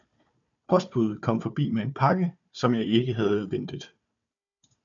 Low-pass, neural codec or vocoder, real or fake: 7.2 kHz; codec, 16 kHz, 4 kbps, FunCodec, trained on Chinese and English, 50 frames a second; fake